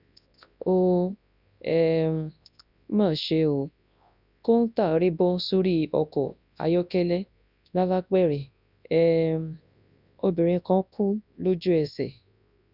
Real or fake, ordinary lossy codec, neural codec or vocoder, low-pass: fake; none; codec, 24 kHz, 0.9 kbps, WavTokenizer, large speech release; 5.4 kHz